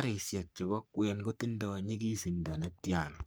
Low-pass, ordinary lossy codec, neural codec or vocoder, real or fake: none; none; codec, 44.1 kHz, 3.4 kbps, Pupu-Codec; fake